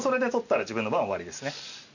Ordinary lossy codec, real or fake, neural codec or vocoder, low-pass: none; real; none; 7.2 kHz